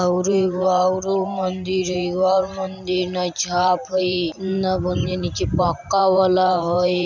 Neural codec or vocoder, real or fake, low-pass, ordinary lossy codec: vocoder, 44.1 kHz, 128 mel bands every 512 samples, BigVGAN v2; fake; 7.2 kHz; none